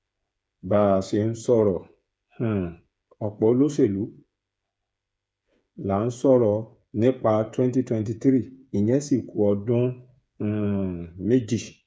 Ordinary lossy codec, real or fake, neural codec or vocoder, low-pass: none; fake; codec, 16 kHz, 8 kbps, FreqCodec, smaller model; none